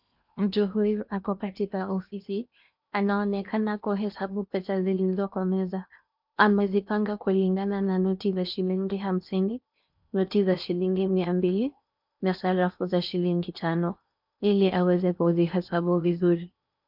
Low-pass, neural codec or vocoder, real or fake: 5.4 kHz; codec, 16 kHz in and 24 kHz out, 0.8 kbps, FocalCodec, streaming, 65536 codes; fake